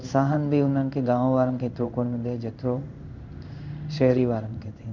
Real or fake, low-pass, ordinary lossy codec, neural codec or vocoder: fake; 7.2 kHz; none; codec, 16 kHz in and 24 kHz out, 1 kbps, XY-Tokenizer